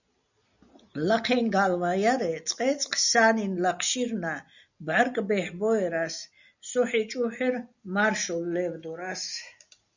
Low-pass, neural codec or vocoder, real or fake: 7.2 kHz; none; real